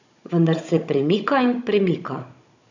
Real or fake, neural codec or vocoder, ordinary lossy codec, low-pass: fake; codec, 16 kHz, 16 kbps, FunCodec, trained on Chinese and English, 50 frames a second; AAC, 48 kbps; 7.2 kHz